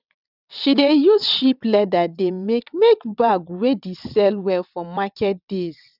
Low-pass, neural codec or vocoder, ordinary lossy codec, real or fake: 5.4 kHz; vocoder, 22.05 kHz, 80 mel bands, WaveNeXt; none; fake